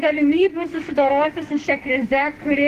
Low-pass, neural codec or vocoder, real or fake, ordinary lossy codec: 14.4 kHz; codec, 44.1 kHz, 2.6 kbps, SNAC; fake; Opus, 16 kbps